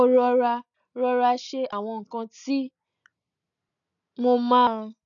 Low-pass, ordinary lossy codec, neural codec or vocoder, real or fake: 7.2 kHz; none; none; real